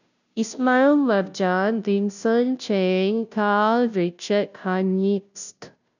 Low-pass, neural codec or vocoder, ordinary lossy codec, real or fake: 7.2 kHz; codec, 16 kHz, 0.5 kbps, FunCodec, trained on Chinese and English, 25 frames a second; none; fake